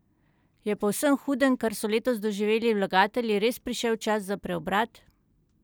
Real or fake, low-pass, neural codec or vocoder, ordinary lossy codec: real; none; none; none